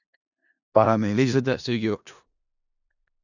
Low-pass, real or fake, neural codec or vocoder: 7.2 kHz; fake; codec, 16 kHz in and 24 kHz out, 0.4 kbps, LongCat-Audio-Codec, four codebook decoder